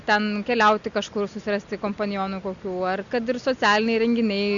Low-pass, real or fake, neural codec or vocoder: 7.2 kHz; real; none